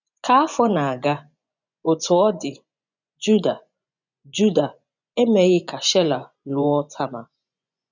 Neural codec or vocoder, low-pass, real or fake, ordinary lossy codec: vocoder, 24 kHz, 100 mel bands, Vocos; 7.2 kHz; fake; none